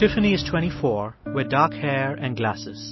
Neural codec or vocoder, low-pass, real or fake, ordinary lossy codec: none; 7.2 kHz; real; MP3, 24 kbps